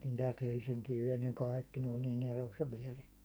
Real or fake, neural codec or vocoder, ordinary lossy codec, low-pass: fake; codec, 44.1 kHz, 2.6 kbps, SNAC; none; none